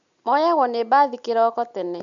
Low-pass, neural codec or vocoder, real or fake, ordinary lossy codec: 7.2 kHz; none; real; none